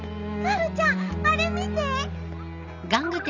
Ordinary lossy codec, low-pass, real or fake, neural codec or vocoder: none; 7.2 kHz; real; none